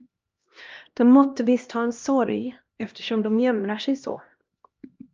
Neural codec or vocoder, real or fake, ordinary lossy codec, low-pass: codec, 16 kHz, 1 kbps, X-Codec, HuBERT features, trained on LibriSpeech; fake; Opus, 24 kbps; 7.2 kHz